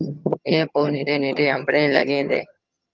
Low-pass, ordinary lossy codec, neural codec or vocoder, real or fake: 7.2 kHz; Opus, 24 kbps; vocoder, 22.05 kHz, 80 mel bands, HiFi-GAN; fake